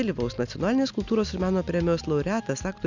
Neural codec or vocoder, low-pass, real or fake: none; 7.2 kHz; real